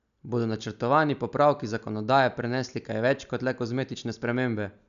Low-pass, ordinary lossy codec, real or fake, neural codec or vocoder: 7.2 kHz; none; real; none